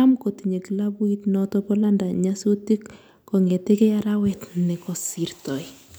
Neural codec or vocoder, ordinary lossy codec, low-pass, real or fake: none; none; none; real